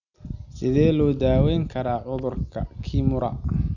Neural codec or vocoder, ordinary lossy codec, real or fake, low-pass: none; none; real; 7.2 kHz